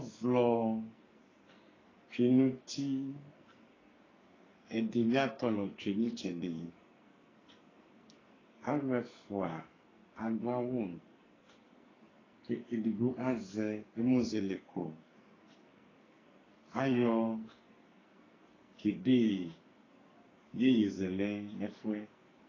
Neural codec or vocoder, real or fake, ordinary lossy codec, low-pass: codec, 44.1 kHz, 2.6 kbps, SNAC; fake; AAC, 32 kbps; 7.2 kHz